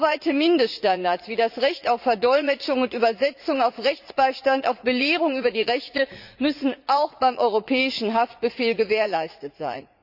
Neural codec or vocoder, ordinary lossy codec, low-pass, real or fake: vocoder, 44.1 kHz, 80 mel bands, Vocos; Opus, 64 kbps; 5.4 kHz; fake